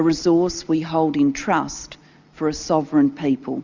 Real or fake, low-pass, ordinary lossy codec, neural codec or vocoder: real; 7.2 kHz; Opus, 64 kbps; none